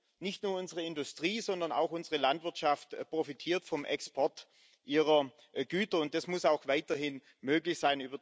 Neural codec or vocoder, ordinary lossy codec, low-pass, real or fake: none; none; none; real